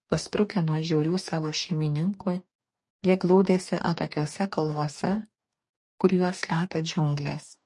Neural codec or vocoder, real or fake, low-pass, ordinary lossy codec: codec, 44.1 kHz, 2.6 kbps, DAC; fake; 10.8 kHz; MP3, 48 kbps